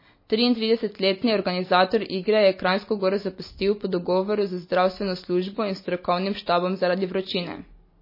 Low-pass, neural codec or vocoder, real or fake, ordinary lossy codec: 5.4 kHz; none; real; MP3, 24 kbps